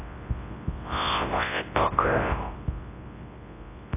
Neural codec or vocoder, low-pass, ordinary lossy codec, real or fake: codec, 24 kHz, 0.9 kbps, WavTokenizer, large speech release; 3.6 kHz; AAC, 24 kbps; fake